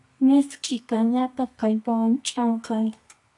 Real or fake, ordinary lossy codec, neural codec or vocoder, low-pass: fake; AAC, 64 kbps; codec, 24 kHz, 0.9 kbps, WavTokenizer, medium music audio release; 10.8 kHz